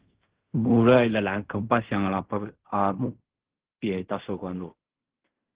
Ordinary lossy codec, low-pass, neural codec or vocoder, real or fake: Opus, 16 kbps; 3.6 kHz; codec, 16 kHz in and 24 kHz out, 0.4 kbps, LongCat-Audio-Codec, fine tuned four codebook decoder; fake